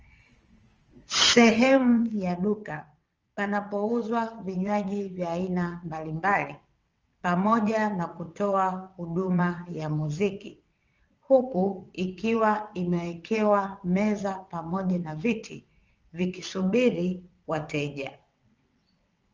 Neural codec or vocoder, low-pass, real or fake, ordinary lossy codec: vocoder, 22.05 kHz, 80 mel bands, WaveNeXt; 7.2 kHz; fake; Opus, 24 kbps